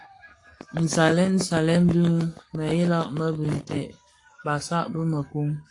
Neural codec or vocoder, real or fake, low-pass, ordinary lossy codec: codec, 44.1 kHz, 7.8 kbps, Pupu-Codec; fake; 10.8 kHz; AAC, 48 kbps